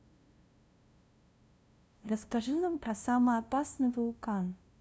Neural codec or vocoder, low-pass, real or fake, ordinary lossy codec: codec, 16 kHz, 0.5 kbps, FunCodec, trained on LibriTTS, 25 frames a second; none; fake; none